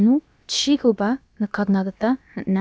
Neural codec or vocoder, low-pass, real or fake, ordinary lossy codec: codec, 16 kHz, about 1 kbps, DyCAST, with the encoder's durations; none; fake; none